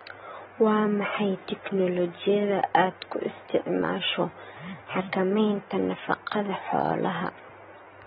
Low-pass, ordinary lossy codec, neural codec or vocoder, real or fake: 19.8 kHz; AAC, 16 kbps; none; real